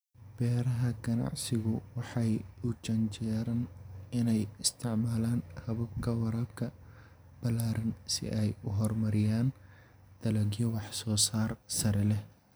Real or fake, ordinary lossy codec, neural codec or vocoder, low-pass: real; none; none; none